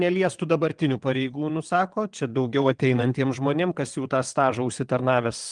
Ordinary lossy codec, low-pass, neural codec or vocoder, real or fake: Opus, 24 kbps; 9.9 kHz; vocoder, 22.05 kHz, 80 mel bands, WaveNeXt; fake